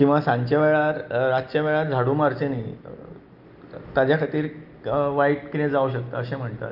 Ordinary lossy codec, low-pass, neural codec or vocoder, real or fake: Opus, 24 kbps; 5.4 kHz; none; real